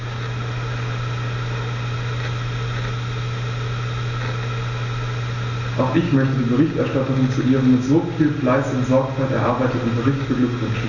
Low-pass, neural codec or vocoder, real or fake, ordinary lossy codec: 7.2 kHz; none; real; none